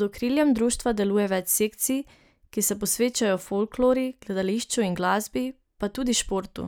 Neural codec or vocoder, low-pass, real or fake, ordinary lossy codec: none; none; real; none